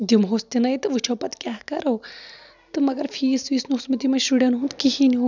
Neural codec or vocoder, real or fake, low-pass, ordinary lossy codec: none; real; 7.2 kHz; none